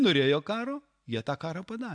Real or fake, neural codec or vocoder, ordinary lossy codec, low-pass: real; none; AAC, 64 kbps; 9.9 kHz